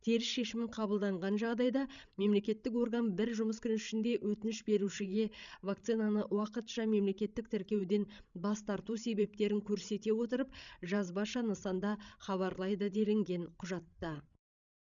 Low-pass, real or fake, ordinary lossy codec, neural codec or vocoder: 7.2 kHz; fake; none; codec, 16 kHz, 16 kbps, FreqCodec, larger model